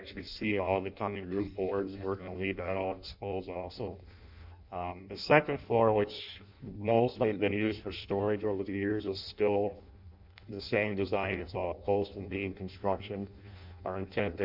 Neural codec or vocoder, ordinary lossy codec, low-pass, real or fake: codec, 16 kHz in and 24 kHz out, 0.6 kbps, FireRedTTS-2 codec; MP3, 48 kbps; 5.4 kHz; fake